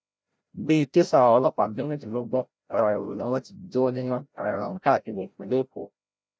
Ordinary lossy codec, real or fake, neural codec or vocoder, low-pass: none; fake; codec, 16 kHz, 0.5 kbps, FreqCodec, larger model; none